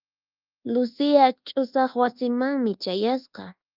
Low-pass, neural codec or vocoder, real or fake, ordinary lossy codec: 5.4 kHz; codec, 24 kHz, 1.2 kbps, DualCodec; fake; Opus, 32 kbps